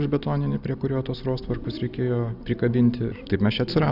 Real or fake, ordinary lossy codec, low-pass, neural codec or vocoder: real; Opus, 64 kbps; 5.4 kHz; none